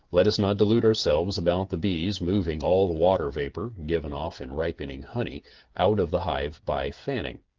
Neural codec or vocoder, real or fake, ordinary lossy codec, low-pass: codec, 16 kHz, 8 kbps, FreqCodec, smaller model; fake; Opus, 32 kbps; 7.2 kHz